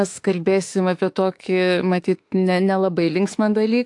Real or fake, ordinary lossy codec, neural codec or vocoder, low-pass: fake; AAC, 64 kbps; autoencoder, 48 kHz, 32 numbers a frame, DAC-VAE, trained on Japanese speech; 10.8 kHz